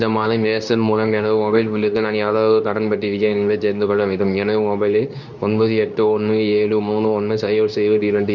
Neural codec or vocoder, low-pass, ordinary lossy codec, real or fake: codec, 24 kHz, 0.9 kbps, WavTokenizer, medium speech release version 2; 7.2 kHz; none; fake